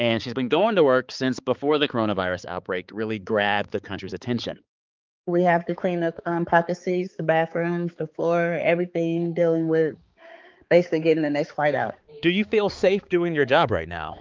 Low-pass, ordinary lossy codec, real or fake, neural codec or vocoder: 7.2 kHz; Opus, 24 kbps; fake; codec, 16 kHz, 4 kbps, X-Codec, HuBERT features, trained on balanced general audio